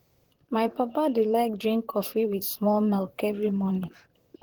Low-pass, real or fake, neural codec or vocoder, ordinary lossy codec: 19.8 kHz; fake; vocoder, 44.1 kHz, 128 mel bands, Pupu-Vocoder; Opus, 16 kbps